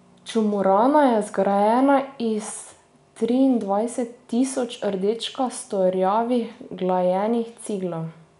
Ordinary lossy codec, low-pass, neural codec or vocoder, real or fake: none; 10.8 kHz; none; real